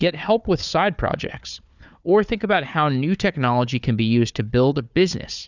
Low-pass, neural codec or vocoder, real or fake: 7.2 kHz; codec, 16 kHz, 4 kbps, FunCodec, trained on Chinese and English, 50 frames a second; fake